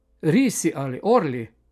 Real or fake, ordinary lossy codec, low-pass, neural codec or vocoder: real; none; 14.4 kHz; none